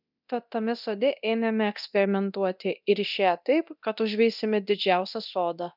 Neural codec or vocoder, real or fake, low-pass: codec, 24 kHz, 0.9 kbps, DualCodec; fake; 5.4 kHz